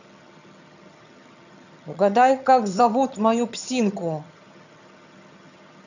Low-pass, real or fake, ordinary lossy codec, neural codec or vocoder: 7.2 kHz; fake; none; vocoder, 22.05 kHz, 80 mel bands, HiFi-GAN